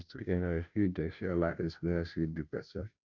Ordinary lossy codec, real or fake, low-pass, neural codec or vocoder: none; fake; 7.2 kHz; codec, 16 kHz, 0.5 kbps, FunCodec, trained on Chinese and English, 25 frames a second